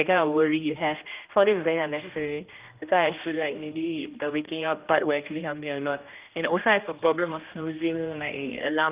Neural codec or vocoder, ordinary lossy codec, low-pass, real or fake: codec, 16 kHz, 1 kbps, X-Codec, HuBERT features, trained on general audio; Opus, 24 kbps; 3.6 kHz; fake